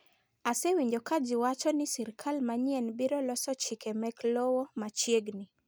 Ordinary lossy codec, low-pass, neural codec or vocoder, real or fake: none; none; none; real